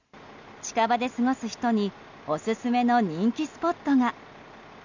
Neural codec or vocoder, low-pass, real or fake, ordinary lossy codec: none; 7.2 kHz; real; none